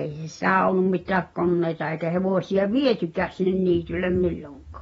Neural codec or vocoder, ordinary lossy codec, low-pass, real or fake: vocoder, 48 kHz, 128 mel bands, Vocos; AAC, 24 kbps; 19.8 kHz; fake